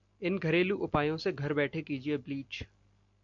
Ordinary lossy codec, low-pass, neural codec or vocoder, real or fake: AAC, 64 kbps; 7.2 kHz; none; real